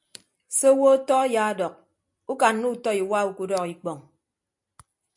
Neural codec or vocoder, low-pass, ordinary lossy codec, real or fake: none; 10.8 kHz; MP3, 64 kbps; real